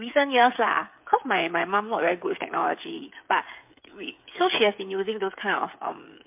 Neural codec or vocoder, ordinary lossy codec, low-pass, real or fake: codec, 16 kHz, 8 kbps, FreqCodec, smaller model; MP3, 32 kbps; 3.6 kHz; fake